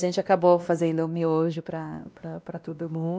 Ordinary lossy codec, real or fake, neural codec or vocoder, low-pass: none; fake; codec, 16 kHz, 1 kbps, X-Codec, WavLM features, trained on Multilingual LibriSpeech; none